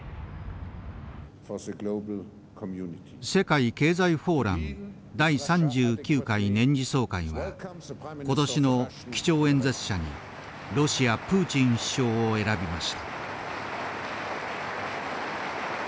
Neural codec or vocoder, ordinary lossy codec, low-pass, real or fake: none; none; none; real